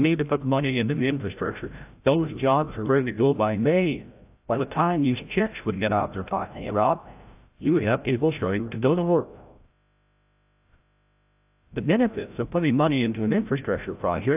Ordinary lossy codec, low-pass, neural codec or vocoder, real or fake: AAC, 32 kbps; 3.6 kHz; codec, 16 kHz, 0.5 kbps, FreqCodec, larger model; fake